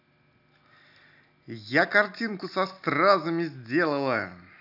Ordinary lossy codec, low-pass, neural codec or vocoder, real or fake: none; 5.4 kHz; none; real